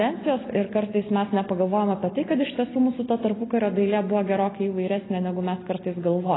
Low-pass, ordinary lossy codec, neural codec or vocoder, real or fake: 7.2 kHz; AAC, 16 kbps; none; real